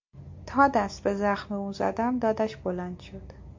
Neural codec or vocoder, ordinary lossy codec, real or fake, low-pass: none; MP3, 48 kbps; real; 7.2 kHz